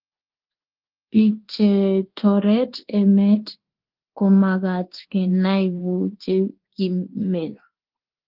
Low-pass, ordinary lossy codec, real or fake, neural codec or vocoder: 5.4 kHz; Opus, 16 kbps; fake; codec, 24 kHz, 1.2 kbps, DualCodec